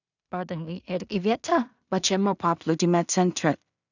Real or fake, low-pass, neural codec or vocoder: fake; 7.2 kHz; codec, 16 kHz in and 24 kHz out, 0.4 kbps, LongCat-Audio-Codec, two codebook decoder